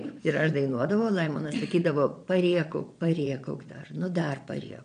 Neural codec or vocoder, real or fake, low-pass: vocoder, 22.05 kHz, 80 mel bands, Vocos; fake; 9.9 kHz